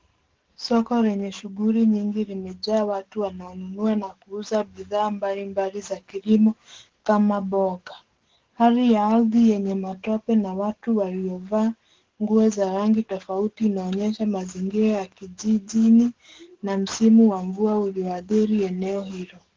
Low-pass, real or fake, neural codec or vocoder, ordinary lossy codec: 7.2 kHz; fake; codec, 44.1 kHz, 7.8 kbps, DAC; Opus, 16 kbps